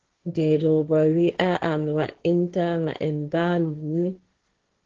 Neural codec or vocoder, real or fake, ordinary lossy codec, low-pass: codec, 16 kHz, 1.1 kbps, Voila-Tokenizer; fake; Opus, 16 kbps; 7.2 kHz